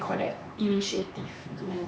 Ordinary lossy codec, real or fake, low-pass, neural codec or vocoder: none; fake; none; codec, 16 kHz, 2 kbps, X-Codec, HuBERT features, trained on LibriSpeech